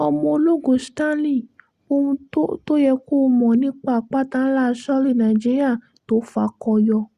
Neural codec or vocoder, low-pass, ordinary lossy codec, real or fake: none; 14.4 kHz; Opus, 64 kbps; real